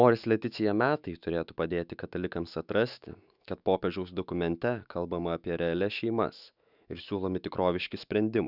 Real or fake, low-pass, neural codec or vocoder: fake; 5.4 kHz; autoencoder, 48 kHz, 128 numbers a frame, DAC-VAE, trained on Japanese speech